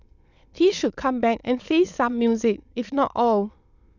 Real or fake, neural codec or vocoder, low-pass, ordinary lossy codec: fake; autoencoder, 22.05 kHz, a latent of 192 numbers a frame, VITS, trained on many speakers; 7.2 kHz; none